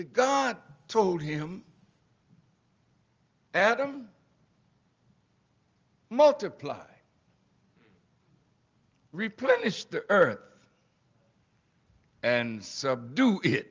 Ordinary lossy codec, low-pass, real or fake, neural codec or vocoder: Opus, 32 kbps; 7.2 kHz; real; none